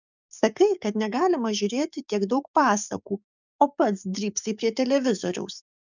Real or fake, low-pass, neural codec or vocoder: fake; 7.2 kHz; codec, 44.1 kHz, 7.8 kbps, DAC